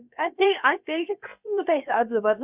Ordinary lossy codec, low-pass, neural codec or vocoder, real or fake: none; 3.6 kHz; codec, 16 kHz, about 1 kbps, DyCAST, with the encoder's durations; fake